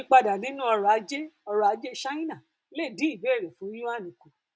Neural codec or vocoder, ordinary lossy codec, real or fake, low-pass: none; none; real; none